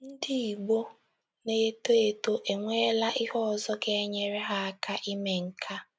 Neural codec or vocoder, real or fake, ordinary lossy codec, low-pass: none; real; none; none